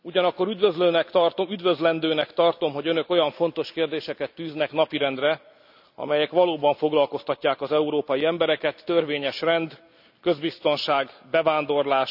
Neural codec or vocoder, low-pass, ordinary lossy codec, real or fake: none; 5.4 kHz; none; real